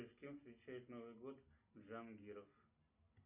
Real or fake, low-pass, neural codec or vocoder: real; 3.6 kHz; none